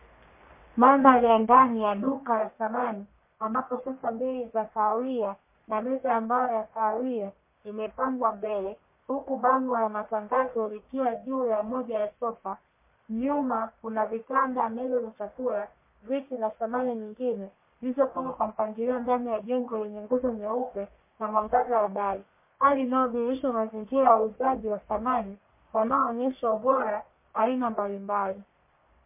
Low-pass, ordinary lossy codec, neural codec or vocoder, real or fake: 3.6 kHz; MP3, 24 kbps; codec, 44.1 kHz, 1.7 kbps, Pupu-Codec; fake